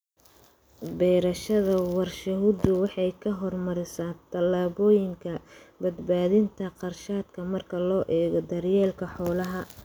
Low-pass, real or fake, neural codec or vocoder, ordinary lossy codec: none; real; none; none